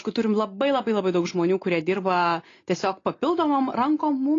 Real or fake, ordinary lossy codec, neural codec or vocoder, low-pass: real; AAC, 32 kbps; none; 7.2 kHz